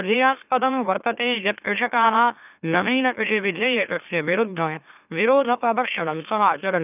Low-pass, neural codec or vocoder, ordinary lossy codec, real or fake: 3.6 kHz; autoencoder, 44.1 kHz, a latent of 192 numbers a frame, MeloTTS; none; fake